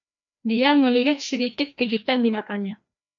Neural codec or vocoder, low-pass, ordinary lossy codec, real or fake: codec, 16 kHz, 1 kbps, FreqCodec, larger model; 7.2 kHz; MP3, 64 kbps; fake